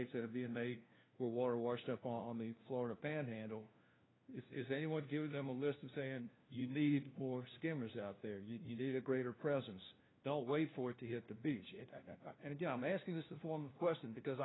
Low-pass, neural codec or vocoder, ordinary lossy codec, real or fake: 7.2 kHz; codec, 16 kHz, 1 kbps, FunCodec, trained on LibriTTS, 50 frames a second; AAC, 16 kbps; fake